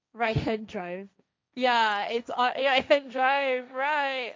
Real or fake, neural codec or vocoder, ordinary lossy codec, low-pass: fake; codec, 16 kHz, 1.1 kbps, Voila-Tokenizer; none; none